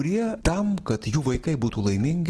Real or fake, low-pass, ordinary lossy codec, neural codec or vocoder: real; 10.8 kHz; Opus, 16 kbps; none